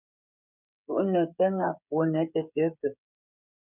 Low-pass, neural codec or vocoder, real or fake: 3.6 kHz; codec, 16 kHz, 16 kbps, FreqCodec, smaller model; fake